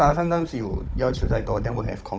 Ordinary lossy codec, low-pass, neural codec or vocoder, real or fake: none; none; codec, 16 kHz, 16 kbps, FunCodec, trained on Chinese and English, 50 frames a second; fake